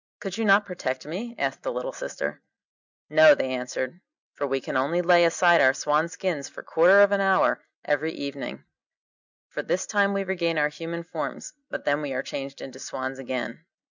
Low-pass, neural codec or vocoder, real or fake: 7.2 kHz; none; real